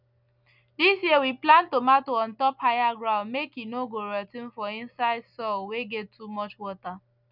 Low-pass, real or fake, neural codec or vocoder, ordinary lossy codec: 5.4 kHz; real; none; none